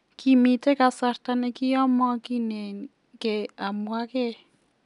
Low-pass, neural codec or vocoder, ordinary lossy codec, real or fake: 10.8 kHz; none; none; real